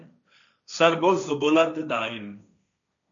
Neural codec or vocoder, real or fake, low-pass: codec, 16 kHz, 1.1 kbps, Voila-Tokenizer; fake; 7.2 kHz